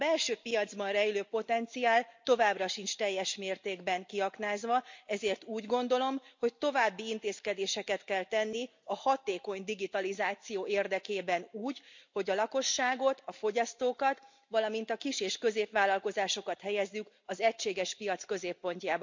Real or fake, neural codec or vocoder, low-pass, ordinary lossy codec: real; none; 7.2 kHz; MP3, 64 kbps